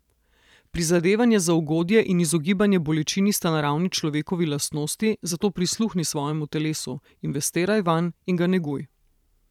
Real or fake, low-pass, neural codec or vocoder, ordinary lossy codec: fake; 19.8 kHz; vocoder, 44.1 kHz, 128 mel bands every 512 samples, BigVGAN v2; none